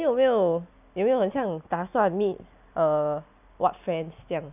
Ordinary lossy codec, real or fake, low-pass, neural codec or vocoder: none; real; 3.6 kHz; none